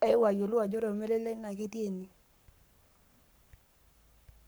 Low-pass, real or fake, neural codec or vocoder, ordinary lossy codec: none; fake; codec, 44.1 kHz, 7.8 kbps, Pupu-Codec; none